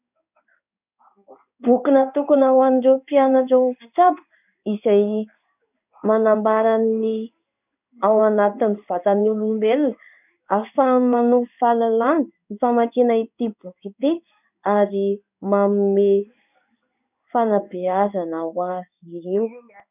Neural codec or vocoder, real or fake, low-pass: codec, 16 kHz in and 24 kHz out, 1 kbps, XY-Tokenizer; fake; 3.6 kHz